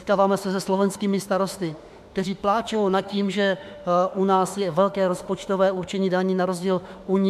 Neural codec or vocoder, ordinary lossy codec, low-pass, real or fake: autoencoder, 48 kHz, 32 numbers a frame, DAC-VAE, trained on Japanese speech; AAC, 96 kbps; 14.4 kHz; fake